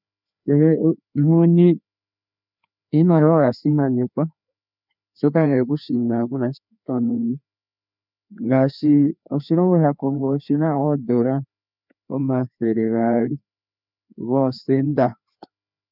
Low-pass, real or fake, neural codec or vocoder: 5.4 kHz; fake; codec, 16 kHz, 2 kbps, FreqCodec, larger model